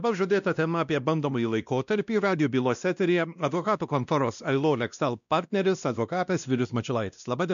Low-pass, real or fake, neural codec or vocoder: 7.2 kHz; fake; codec, 16 kHz, 1 kbps, X-Codec, WavLM features, trained on Multilingual LibriSpeech